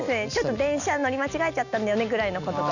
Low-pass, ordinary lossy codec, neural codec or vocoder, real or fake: 7.2 kHz; none; none; real